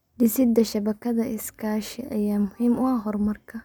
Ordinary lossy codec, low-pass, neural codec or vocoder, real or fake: none; none; none; real